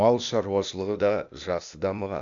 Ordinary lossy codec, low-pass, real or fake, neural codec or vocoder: AAC, 64 kbps; 7.2 kHz; fake; codec, 16 kHz, 0.8 kbps, ZipCodec